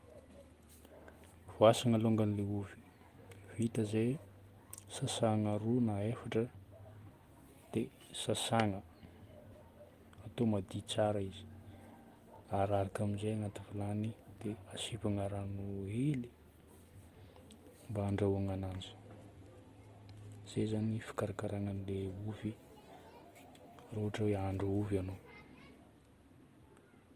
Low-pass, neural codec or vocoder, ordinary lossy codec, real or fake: 14.4 kHz; none; Opus, 24 kbps; real